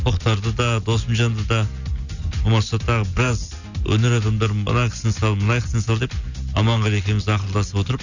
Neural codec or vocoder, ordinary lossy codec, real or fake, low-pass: none; none; real; 7.2 kHz